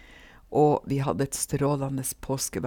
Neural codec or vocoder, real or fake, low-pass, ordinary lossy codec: none; real; 19.8 kHz; none